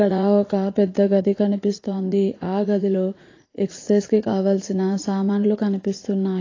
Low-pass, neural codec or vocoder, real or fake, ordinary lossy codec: 7.2 kHz; vocoder, 44.1 kHz, 80 mel bands, Vocos; fake; AAC, 32 kbps